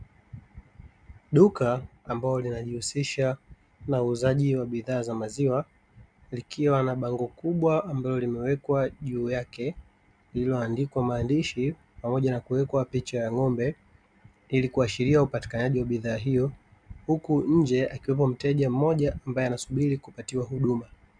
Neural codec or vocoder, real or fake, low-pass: none; real; 9.9 kHz